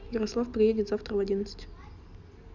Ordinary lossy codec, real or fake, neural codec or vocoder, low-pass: none; real; none; 7.2 kHz